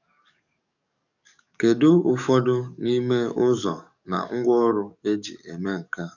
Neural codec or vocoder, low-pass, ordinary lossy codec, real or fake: codec, 44.1 kHz, 7.8 kbps, DAC; 7.2 kHz; none; fake